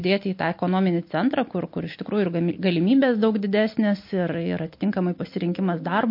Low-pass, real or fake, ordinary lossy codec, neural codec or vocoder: 5.4 kHz; real; MP3, 32 kbps; none